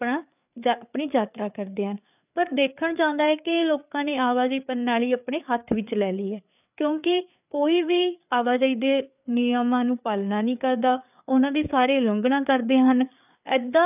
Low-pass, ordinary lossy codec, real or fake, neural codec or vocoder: 3.6 kHz; none; fake; codec, 16 kHz, 4 kbps, FreqCodec, larger model